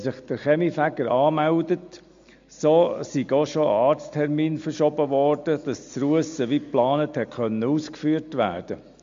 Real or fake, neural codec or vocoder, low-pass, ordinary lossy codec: real; none; 7.2 kHz; MP3, 48 kbps